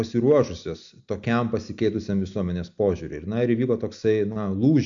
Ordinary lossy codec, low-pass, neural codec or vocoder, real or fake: AAC, 64 kbps; 7.2 kHz; none; real